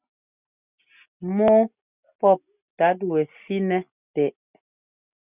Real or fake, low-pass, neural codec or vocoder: real; 3.6 kHz; none